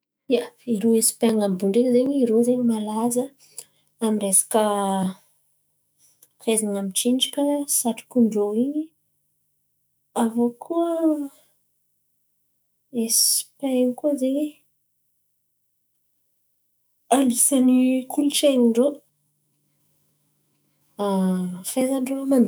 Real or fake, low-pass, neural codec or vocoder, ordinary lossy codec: fake; none; autoencoder, 48 kHz, 128 numbers a frame, DAC-VAE, trained on Japanese speech; none